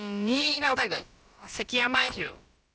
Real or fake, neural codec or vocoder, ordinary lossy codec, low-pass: fake; codec, 16 kHz, about 1 kbps, DyCAST, with the encoder's durations; none; none